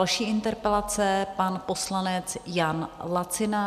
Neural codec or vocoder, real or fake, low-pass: none; real; 14.4 kHz